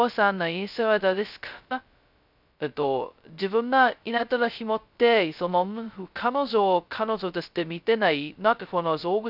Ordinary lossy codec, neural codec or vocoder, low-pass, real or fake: none; codec, 16 kHz, 0.2 kbps, FocalCodec; 5.4 kHz; fake